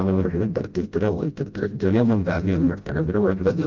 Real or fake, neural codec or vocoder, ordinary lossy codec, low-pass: fake; codec, 16 kHz, 0.5 kbps, FreqCodec, smaller model; Opus, 32 kbps; 7.2 kHz